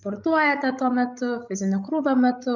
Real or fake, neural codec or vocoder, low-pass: fake; codec, 16 kHz, 16 kbps, FreqCodec, larger model; 7.2 kHz